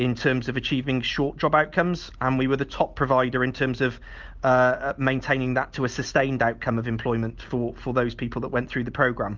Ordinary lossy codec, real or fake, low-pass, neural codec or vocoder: Opus, 32 kbps; real; 7.2 kHz; none